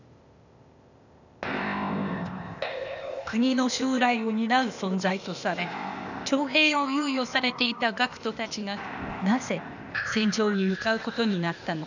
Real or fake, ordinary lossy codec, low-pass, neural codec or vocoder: fake; none; 7.2 kHz; codec, 16 kHz, 0.8 kbps, ZipCodec